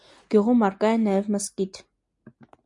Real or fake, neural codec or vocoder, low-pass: real; none; 10.8 kHz